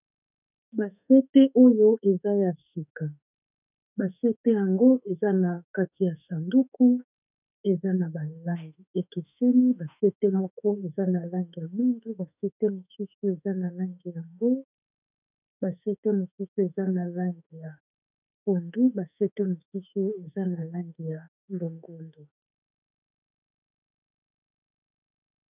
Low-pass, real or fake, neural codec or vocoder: 3.6 kHz; fake; autoencoder, 48 kHz, 32 numbers a frame, DAC-VAE, trained on Japanese speech